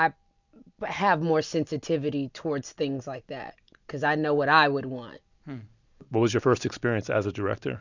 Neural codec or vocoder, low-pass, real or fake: none; 7.2 kHz; real